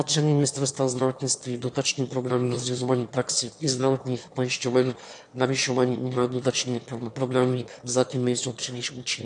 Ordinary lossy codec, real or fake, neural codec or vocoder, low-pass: AAC, 64 kbps; fake; autoencoder, 22.05 kHz, a latent of 192 numbers a frame, VITS, trained on one speaker; 9.9 kHz